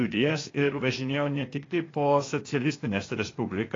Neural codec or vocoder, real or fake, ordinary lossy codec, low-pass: codec, 16 kHz, 0.8 kbps, ZipCodec; fake; AAC, 32 kbps; 7.2 kHz